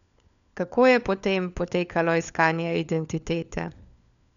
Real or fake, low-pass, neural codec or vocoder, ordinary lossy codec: fake; 7.2 kHz; codec, 16 kHz, 4 kbps, FunCodec, trained on LibriTTS, 50 frames a second; none